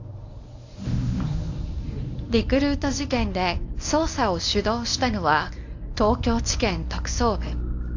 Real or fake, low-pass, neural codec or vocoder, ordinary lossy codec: fake; 7.2 kHz; codec, 24 kHz, 0.9 kbps, WavTokenizer, medium speech release version 1; AAC, 48 kbps